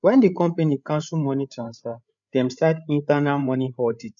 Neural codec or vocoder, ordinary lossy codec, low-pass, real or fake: codec, 16 kHz, 8 kbps, FreqCodec, larger model; none; 7.2 kHz; fake